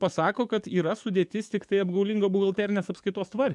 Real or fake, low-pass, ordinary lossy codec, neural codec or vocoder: fake; 10.8 kHz; MP3, 96 kbps; codec, 44.1 kHz, 7.8 kbps, DAC